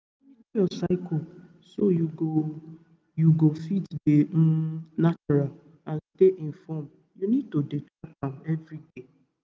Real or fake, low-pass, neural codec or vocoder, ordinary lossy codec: real; none; none; none